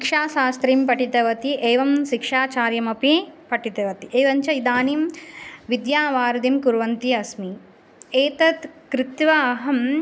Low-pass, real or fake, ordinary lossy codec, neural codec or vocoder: none; real; none; none